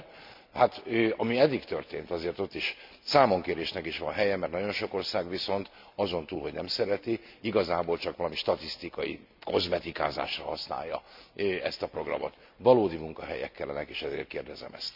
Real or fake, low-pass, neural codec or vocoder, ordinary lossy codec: real; 5.4 kHz; none; MP3, 48 kbps